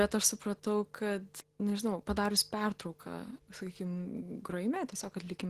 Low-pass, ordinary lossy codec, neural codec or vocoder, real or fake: 14.4 kHz; Opus, 16 kbps; none; real